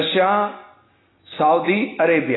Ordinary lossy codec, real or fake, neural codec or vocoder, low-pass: AAC, 16 kbps; real; none; 7.2 kHz